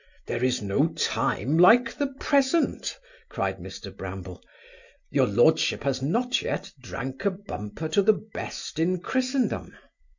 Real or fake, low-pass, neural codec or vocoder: real; 7.2 kHz; none